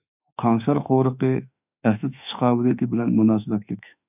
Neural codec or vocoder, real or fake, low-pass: autoencoder, 48 kHz, 32 numbers a frame, DAC-VAE, trained on Japanese speech; fake; 3.6 kHz